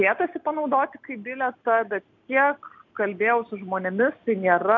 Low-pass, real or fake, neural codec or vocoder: 7.2 kHz; real; none